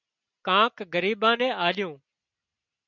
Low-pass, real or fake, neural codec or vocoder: 7.2 kHz; real; none